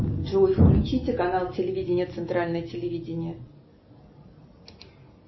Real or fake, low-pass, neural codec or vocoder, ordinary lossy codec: real; 7.2 kHz; none; MP3, 24 kbps